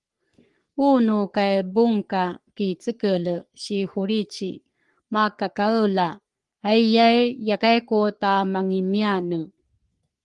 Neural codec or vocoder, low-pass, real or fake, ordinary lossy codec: codec, 44.1 kHz, 3.4 kbps, Pupu-Codec; 10.8 kHz; fake; Opus, 24 kbps